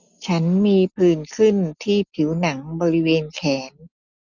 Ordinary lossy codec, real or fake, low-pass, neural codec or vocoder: none; real; 7.2 kHz; none